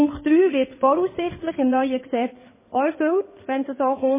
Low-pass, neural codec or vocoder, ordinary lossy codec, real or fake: 3.6 kHz; codec, 16 kHz in and 24 kHz out, 2.2 kbps, FireRedTTS-2 codec; MP3, 16 kbps; fake